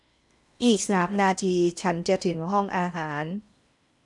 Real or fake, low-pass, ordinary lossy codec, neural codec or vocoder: fake; 10.8 kHz; none; codec, 16 kHz in and 24 kHz out, 0.8 kbps, FocalCodec, streaming, 65536 codes